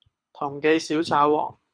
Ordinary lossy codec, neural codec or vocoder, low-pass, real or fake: Opus, 64 kbps; codec, 24 kHz, 6 kbps, HILCodec; 9.9 kHz; fake